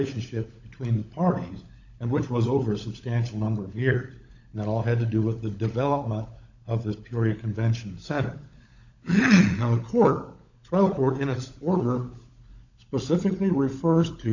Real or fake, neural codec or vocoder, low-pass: fake; codec, 16 kHz, 16 kbps, FunCodec, trained on LibriTTS, 50 frames a second; 7.2 kHz